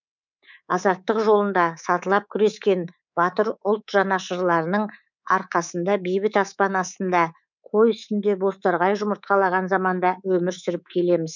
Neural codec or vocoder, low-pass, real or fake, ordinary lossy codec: codec, 24 kHz, 3.1 kbps, DualCodec; 7.2 kHz; fake; none